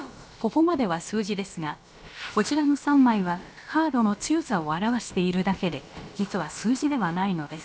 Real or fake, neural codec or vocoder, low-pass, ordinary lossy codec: fake; codec, 16 kHz, about 1 kbps, DyCAST, with the encoder's durations; none; none